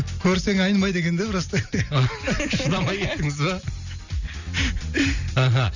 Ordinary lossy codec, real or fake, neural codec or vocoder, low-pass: none; real; none; 7.2 kHz